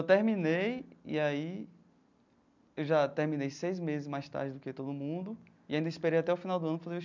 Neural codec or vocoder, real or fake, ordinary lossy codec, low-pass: none; real; none; 7.2 kHz